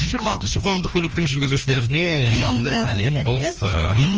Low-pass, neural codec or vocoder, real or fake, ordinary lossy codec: 7.2 kHz; codec, 16 kHz, 1 kbps, FreqCodec, larger model; fake; Opus, 24 kbps